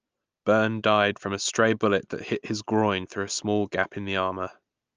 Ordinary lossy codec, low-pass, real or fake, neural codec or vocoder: Opus, 24 kbps; 7.2 kHz; real; none